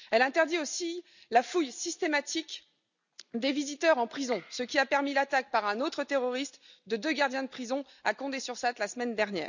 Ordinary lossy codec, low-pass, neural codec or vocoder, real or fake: none; 7.2 kHz; none; real